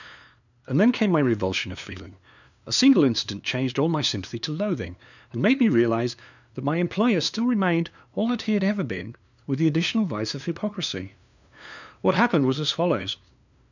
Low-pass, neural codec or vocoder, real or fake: 7.2 kHz; codec, 16 kHz, 2 kbps, FunCodec, trained on LibriTTS, 25 frames a second; fake